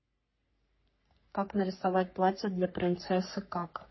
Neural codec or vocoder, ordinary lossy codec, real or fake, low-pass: codec, 44.1 kHz, 3.4 kbps, Pupu-Codec; MP3, 24 kbps; fake; 7.2 kHz